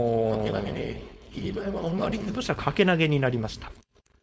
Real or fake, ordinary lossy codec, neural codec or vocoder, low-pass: fake; none; codec, 16 kHz, 4.8 kbps, FACodec; none